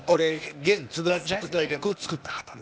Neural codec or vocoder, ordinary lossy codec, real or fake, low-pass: codec, 16 kHz, 0.8 kbps, ZipCodec; none; fake; none